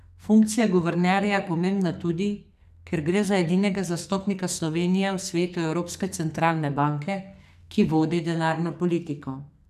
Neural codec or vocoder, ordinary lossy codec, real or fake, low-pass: codec, 44.1 kHz, 2.6 kbps, SNAC; none; fake; 14.4 kHz